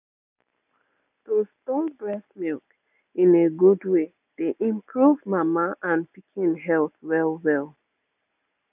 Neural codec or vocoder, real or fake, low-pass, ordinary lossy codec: none; real; 3.6 kHz; none